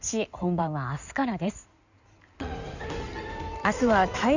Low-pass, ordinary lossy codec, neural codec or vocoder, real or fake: 7.2 kHz; none; codec, 16 kHz in and 24 kHz out, 2.2 kbps, FireRedTTS-2 codec; fake